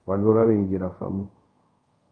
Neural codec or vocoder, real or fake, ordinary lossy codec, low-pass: codec, 24 kHz, 0.5 kbps, DualCodec; fake; Opus, 32 kbps; 9.9 kHz